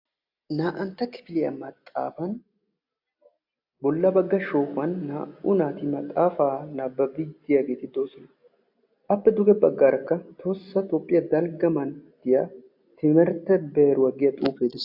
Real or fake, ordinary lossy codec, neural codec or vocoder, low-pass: real; AAC, 48 kbps; none; 5.4 kHz